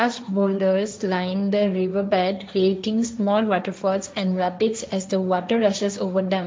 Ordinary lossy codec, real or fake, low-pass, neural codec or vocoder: none; fake; none; codec, 16 kHz, 1.1 kbps, Voila-Tokenizer